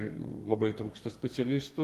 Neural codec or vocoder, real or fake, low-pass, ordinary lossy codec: codec, 32 kHz, 1.9 kbps, SNAC; fake; 14.4 kHz; Opus, 24 kbps